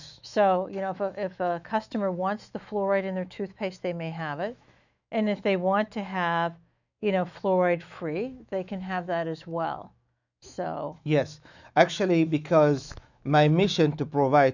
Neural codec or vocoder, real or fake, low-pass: autoencoder, 48 kHz, 128 numbers a frame, DAC-VAE, trained on Japanese speech; fake; 7.2 kHz